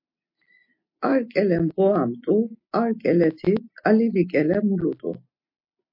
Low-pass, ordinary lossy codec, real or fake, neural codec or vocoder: 5.4 kHz; MP3, 32 kbps; real; none